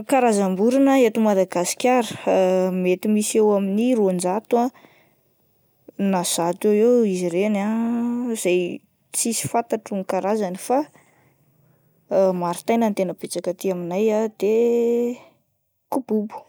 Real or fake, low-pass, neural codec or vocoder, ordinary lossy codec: real; none; none; none